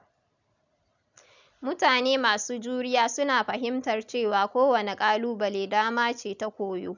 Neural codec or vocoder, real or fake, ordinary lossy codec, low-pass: none; real; none; 7.2 kHz